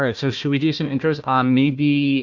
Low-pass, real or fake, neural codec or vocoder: 7.2 kHz; fake; codec, 16 kHz, 1 kbps, FunCodec, trained on Chinese and English, 50 frames a second